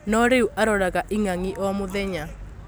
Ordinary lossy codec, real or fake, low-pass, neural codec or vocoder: none; real; none; none